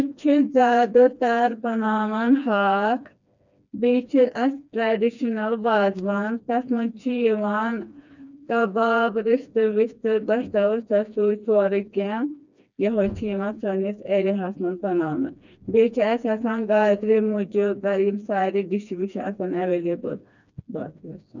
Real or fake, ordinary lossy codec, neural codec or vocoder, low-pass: fake; none; codec, 16 kHz, 2 kbps, FreqCodec, smaller model; 7.2 kHz